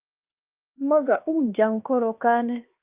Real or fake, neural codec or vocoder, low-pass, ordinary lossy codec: fake; codec, 16 kHz, 2 kbps, X-Codec, HuBERT features, trained on LibriSpeech; 3.6 kHz; Opus, 24 kbps